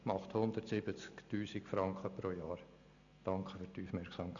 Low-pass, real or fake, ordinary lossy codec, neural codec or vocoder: 7.2 kHz; real; none; none